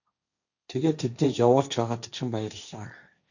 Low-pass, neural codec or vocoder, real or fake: 7.2 kHz; codec, 16 kHz, 1.1 kbps, Voila-Tokenizer; fake